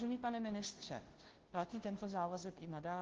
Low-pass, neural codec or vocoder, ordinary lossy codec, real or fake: 7.2 kHz; codec, 16 kHz, 0.5 kbps, FunCodec, trained on Chinese and English, 25 frames a second; Opus, 16 kbps; fake